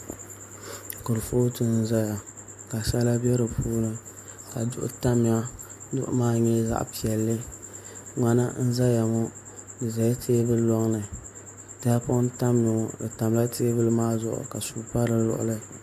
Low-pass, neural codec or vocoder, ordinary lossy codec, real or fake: 14.4 kHz; none; MP3, 64 kbps; real